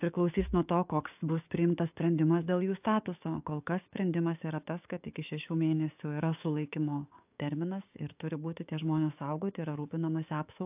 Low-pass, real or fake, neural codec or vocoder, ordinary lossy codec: 3.6 kHz; fake; codec, 16 kHz, 6 kbps, DAC; AAC, 32 kbps